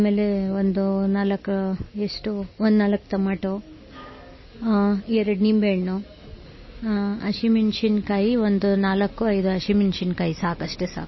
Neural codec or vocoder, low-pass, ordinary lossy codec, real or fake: none; 7.2 kHz; MP3, 24 kbps; real